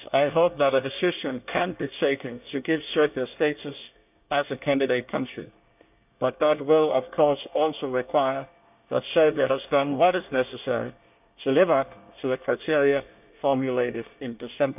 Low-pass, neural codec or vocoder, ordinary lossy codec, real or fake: 3.6 kHz; codec, 24 kHz, 1 kbps, SNAC; none; fake